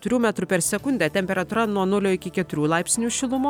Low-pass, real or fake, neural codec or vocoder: 19.8 kHz; real; none